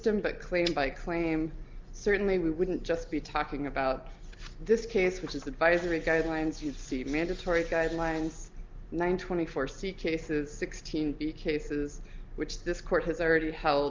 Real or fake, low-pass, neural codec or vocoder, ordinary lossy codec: real; 7.2 kHz; none; Opus, 24 kbps